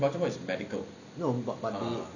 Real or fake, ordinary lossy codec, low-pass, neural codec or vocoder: real; none; 7.2 kHz; none